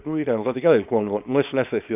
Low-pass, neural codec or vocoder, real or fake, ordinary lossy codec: 3.6 kHz; codec, 24 kHz, 0.9 kbps, WavTokenizer, small release; fake; none